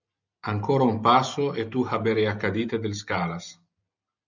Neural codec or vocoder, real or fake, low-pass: none; real; 7.2 kHz